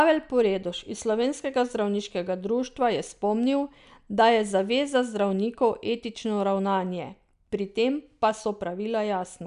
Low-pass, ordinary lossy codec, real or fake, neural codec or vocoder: 10.8 kHz; none; real; none